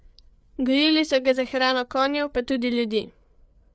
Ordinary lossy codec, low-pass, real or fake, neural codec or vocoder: none; none; fake; codec, 16 kHz, 8 kbps, FreqCodec, larger model